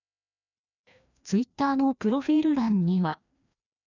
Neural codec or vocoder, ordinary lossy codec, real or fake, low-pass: codec, 16 kHz, 1 kbps, FreqCodec, larger model; none; fake; 7.2 kHz